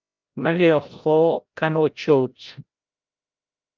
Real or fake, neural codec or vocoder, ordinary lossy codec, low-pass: fake; codec, 16 kHz, 0.5 kbps, FreqCodec, larger model; Opus, 32 kbps; 7.2 kHz